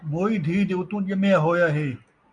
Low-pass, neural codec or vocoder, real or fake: 9.9 kHz; none; real